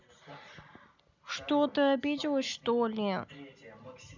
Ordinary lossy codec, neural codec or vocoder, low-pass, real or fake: none; none; 7.2 kHz; real